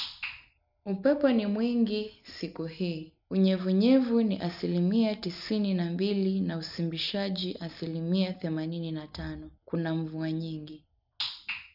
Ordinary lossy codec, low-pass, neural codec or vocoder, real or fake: none; 5.4 kHz; none; real